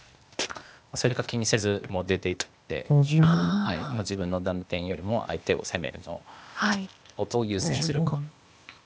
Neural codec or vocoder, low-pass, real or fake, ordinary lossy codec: codec, 16 kHz, 0.8 kbps, ZipCodec; none; fake; none